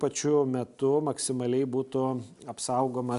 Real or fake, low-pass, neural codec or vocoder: real; 10.8 kHz; none